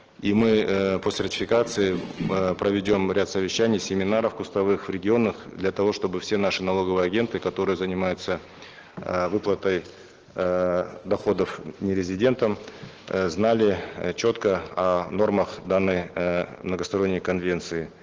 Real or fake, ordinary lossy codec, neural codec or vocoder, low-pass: real; Opus, 16 kbps; none; 7.2 kHz